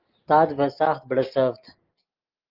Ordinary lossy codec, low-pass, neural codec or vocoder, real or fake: Opus, 24 kbps; 5.4 kHz; none; real